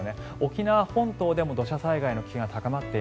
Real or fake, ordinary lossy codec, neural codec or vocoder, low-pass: real; none; none; none